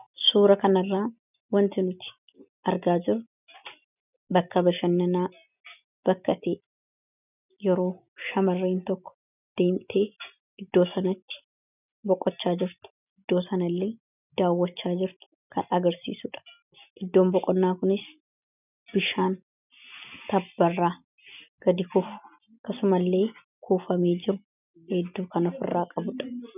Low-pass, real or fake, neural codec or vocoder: 3.6 kHz; real; none